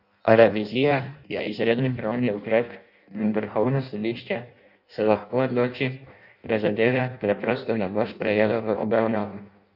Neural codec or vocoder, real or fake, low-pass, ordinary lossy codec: codec, 16 kHz in and 24 kHz out, 0.6 kbps, FireRedTTS-2 codec; fake; 5.4 kHz; none